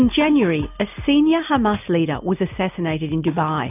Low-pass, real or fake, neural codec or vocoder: 3.6 kHz; real; none